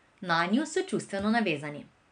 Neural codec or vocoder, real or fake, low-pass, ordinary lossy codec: none; real; 9.9 kHz; MP3, 96 kbps